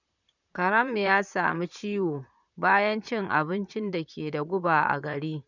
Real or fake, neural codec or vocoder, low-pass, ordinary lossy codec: fake; vocoder, 44.1 kHz, 128 mel bands, Pupu-Vocoder; 7.2 kHz; none